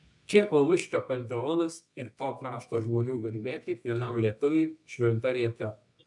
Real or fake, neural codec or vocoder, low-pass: fake; codec, 24 kHz, 0.9 kbps, WavTokenizer, medium music audio release; 10.8 kHz